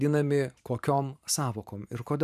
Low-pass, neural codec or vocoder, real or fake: 14.4 kHz; vocoder, 44.1 kHz, 128 mel bands every 512 samples, BigVGAN v2; fake